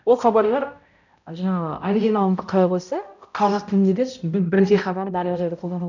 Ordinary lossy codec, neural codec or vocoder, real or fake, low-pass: none; codec, 16 kHz, 0.5 kbps, X-Codec, HuBERT features, trained on balanced general audio; fake; 7.2 kHz